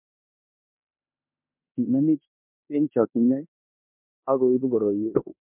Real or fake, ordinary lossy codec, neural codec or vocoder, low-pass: fake; MP3, 32 kbps; codec, 16 kHz in and 24 kHz out, 0.9 kbps, LongCat-Audio-Codec, four codebook decoder; 3.6 kHz